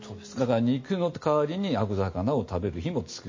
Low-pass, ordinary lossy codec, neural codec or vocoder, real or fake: 7.2 kHz; MP3, 32 kbps; none; real